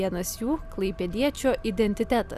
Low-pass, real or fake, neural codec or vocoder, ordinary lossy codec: 14.4 kHz; real; none; Opus, 64 kbps